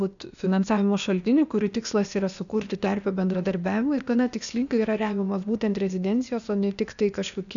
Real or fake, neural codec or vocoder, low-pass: fake; codec, 16 kHz, 0.8 kbps, ZipCodec; 7.2 kHz